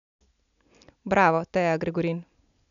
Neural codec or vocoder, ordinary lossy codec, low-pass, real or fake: none; none; 7.2 kHz; real